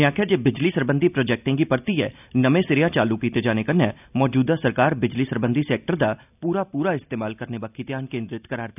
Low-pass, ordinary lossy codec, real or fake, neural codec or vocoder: 3.6 kHz; none; real; none